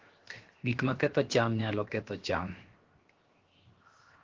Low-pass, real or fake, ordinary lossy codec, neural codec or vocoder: 7.2 kHz; fake; Opus, 16 kbps; codec, 16 kHz, 0.7 kbps, FocalCodec